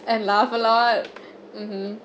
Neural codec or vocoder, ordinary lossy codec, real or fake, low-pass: none; none; real; none